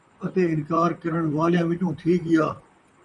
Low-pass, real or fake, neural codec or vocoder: 10.8 kHz; fake; vocoder, 44.1 kHz, 128 mel bands, Pupu-Vocoder